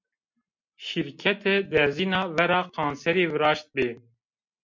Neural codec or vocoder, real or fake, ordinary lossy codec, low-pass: none; real; MP3, 48 kbps; 7.2 kHz